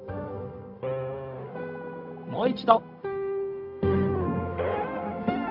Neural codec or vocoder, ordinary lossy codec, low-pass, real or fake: codec, 16 kHz, 0.4 kbps, LongCat-Audio-Codec; none; 5.4 kHz; fake